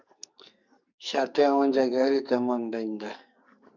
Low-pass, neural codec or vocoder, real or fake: 7.2 kHz; codec, 44.1 kHz, 2.6 kbps, SNAC; fake